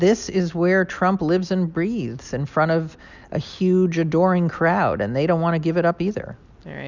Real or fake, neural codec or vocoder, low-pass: real; none; 7.2 kHz